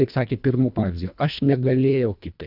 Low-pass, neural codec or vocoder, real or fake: 5.4 kHz; codec, 24 kHz, 1.5 kbps, HILCodec; fake